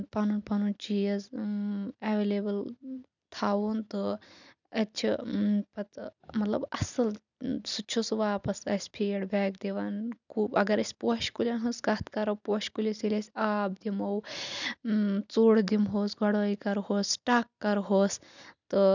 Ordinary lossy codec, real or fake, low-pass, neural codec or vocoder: none; fake; 7.2 kHz; vocoder, 44.1 kHz, 128 mel bands every 256 samples, BigVGAN v2